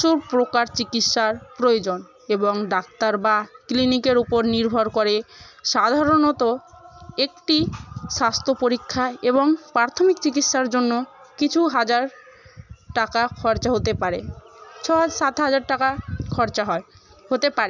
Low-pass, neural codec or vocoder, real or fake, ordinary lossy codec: 7.2 kHz; none; real; none